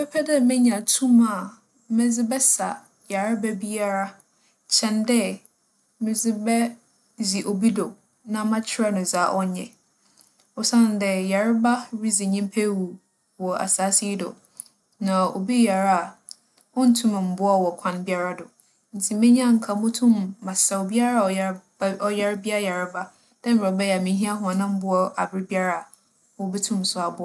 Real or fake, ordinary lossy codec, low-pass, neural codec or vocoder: real; none; none; none